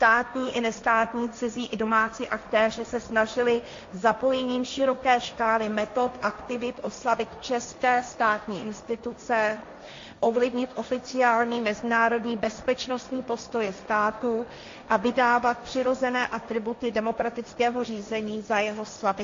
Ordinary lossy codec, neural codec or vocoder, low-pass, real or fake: AAC, 48 kbps; codec, 16 kHz, 1.1 kbps, Voila-Tokenizer; 7.2 kHz; fake